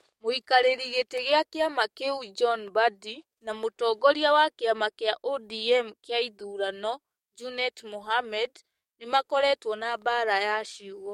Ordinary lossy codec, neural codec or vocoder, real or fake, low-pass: MP3, 64 kbps; codec, 44.1 kHz, 7.8 kbps, DAC; fake; 19.8 kHz